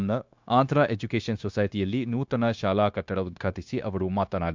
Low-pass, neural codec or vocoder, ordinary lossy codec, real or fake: 7.2 kHz; codec, 16 kHz, 0.9 kbps, LongCat-Audio-Codec; MP3, 64 kbps; fake